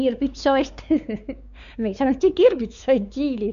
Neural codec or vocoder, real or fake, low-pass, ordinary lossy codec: codec, 16 kHz, 4 kbps, X-Codec, WavLM features, trained on Multilingual LibriSpeech; fake; 7.2 kHz; none